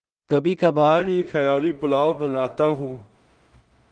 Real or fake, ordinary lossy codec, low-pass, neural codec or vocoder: fake; Opus, 24 kbps; 9.9 kHz; codec, 16 kHz in and 24 kHz out, 0.4 kbps, LongCat-Audio-Codec, two codebook decoder